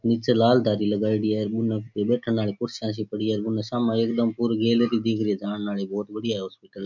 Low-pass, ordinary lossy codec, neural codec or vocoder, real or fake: 7.2 kHz; none; none; real